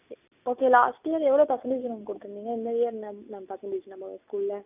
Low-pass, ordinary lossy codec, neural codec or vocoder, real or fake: 3.6 kHz; none; none; real